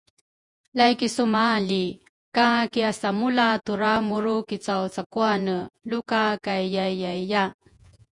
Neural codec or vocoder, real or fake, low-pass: vocoder, 48 kHz, 128 mel bands, Vocos; fake; 10.8 kHz